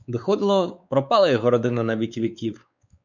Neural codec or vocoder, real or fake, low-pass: codec, 16 kHz, 4 kbps, X-Codec, WavLM features, trained on Multilingual LibriSpeech; fake; 7.2 kHz